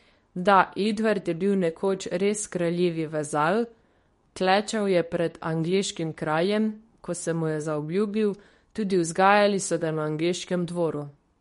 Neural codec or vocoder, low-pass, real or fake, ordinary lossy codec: codec, 24 kHz, 0.9 kbps, WavTokenizer, medium speech release version 2; 10.8 kHz; fake; MP3, 48 kbps